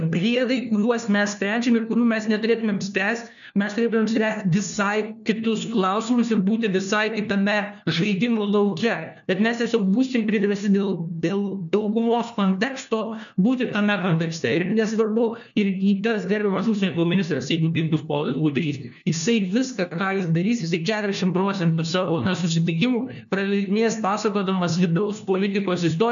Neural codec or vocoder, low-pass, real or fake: codec, 16 kHz, 1 kbps, FunCodec, trained on LibriTTS, 50 frames a second; 7.2 kHz; fake